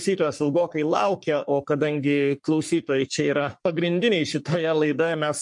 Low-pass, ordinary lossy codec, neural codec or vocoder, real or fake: 10.8 kHz; MP3, 64 kbps; codec, 44.1 kHz, 3.4 kbps, Pupu-Codec; fake